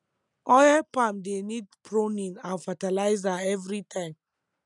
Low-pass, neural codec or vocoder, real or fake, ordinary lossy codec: 10.8 kHz; none; real; none